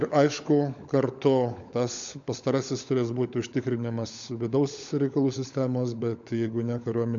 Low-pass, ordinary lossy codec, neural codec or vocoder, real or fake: 7.2 kHz; MP3, 64 kbps; codec, 16 kHz, 16 kbps, FunCodec, trained on LibriTTS, 50 frames a second; fake